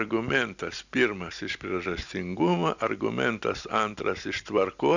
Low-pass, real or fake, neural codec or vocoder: 7.2 kHz; real; none